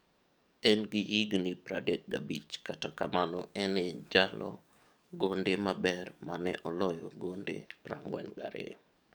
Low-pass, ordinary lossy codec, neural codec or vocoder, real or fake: none; none; codec, 44.1 kHz, 7.8 kbps, DAC; fake